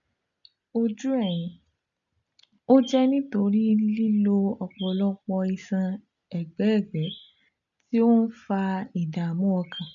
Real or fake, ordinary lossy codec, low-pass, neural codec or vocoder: real; none; 7.2 kHz; none